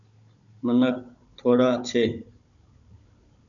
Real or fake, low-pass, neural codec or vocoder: fake; 7.2 kHz; codec, 16 kHz, 16 kbps, FunCodec, trained on Chinese and English, 50 frames a second